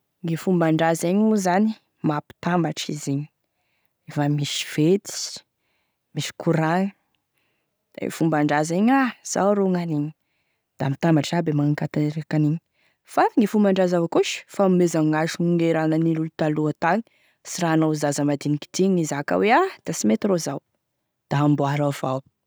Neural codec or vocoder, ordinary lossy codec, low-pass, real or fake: none; none; none; real